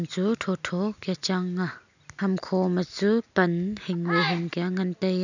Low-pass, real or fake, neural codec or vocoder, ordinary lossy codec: 7.2 kHz; real; none; none